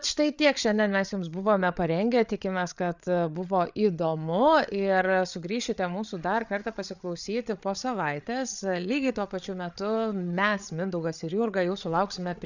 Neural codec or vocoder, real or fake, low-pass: codec, 16 kHz, 8 kbps, FreqCodec, larger model; fake; 7.2 kHz